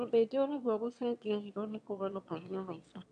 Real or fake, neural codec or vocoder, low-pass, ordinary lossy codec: fake; autoencoder, 22.05 kHz, a latent of 192 numbers a frame, VITS, trained on one speaker; 9.9 kHz; none